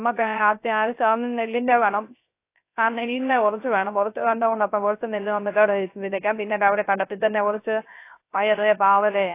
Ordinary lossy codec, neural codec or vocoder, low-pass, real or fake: AAC, 24 kbps; codec, 16 kHz, 0.3 kbps, FocalCodec; 3.6 kHz; fake